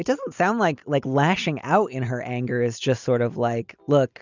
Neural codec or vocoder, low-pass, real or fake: none; 7.2 kHz; real